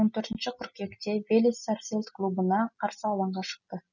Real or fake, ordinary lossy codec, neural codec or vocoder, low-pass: real; none; none; 7.2 kHz